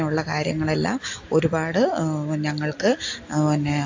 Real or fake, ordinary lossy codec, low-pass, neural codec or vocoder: real; AAC, 48 kbps; 7.2 kHz; none